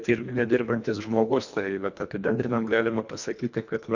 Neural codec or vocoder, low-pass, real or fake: codec, 24 kHz, 1.5 kbps, HILCodec; 7.2 kHz; fake